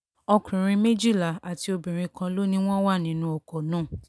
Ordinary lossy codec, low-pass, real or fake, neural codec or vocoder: none; none; real; none